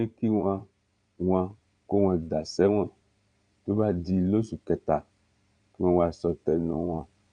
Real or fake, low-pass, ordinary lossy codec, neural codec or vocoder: fake; 9.9 kHz; none; vocoder, 22.05 kHz, 80 mel bands, Vocos